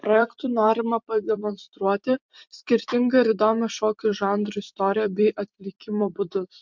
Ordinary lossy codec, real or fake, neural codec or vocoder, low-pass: MP3, 64 kbps; fake; vocoder, 44.1 kHz, 128 mel bands every 256 samples, BigVGAN v2; 7.2 kHz